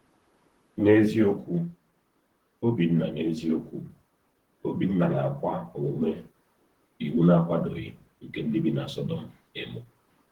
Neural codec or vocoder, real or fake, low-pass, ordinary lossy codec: vocoder, 44.1 kHz, 128 mel bands, Pupu-Vocoder; fake; 19.8 kHz; Opus, 16 kbps